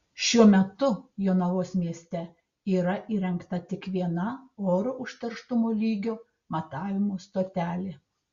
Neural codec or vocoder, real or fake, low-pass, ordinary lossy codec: none; real; 7.2 kHz; Opus, 64 kbps